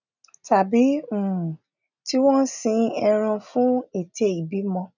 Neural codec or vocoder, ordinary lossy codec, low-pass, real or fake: none; none; 7.2 kHz; real